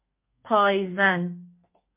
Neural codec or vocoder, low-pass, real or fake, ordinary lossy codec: codec, 32 kHz, 1.9 kbps, SNAC; 3.6 kHz; fake; MP3, 24 kbps